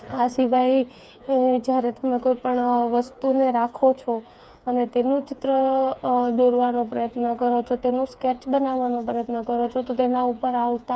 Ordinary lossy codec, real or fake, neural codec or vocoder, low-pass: none; fake; codec, 16 kHz, 4 kbps, FreqCodec, smaller model; none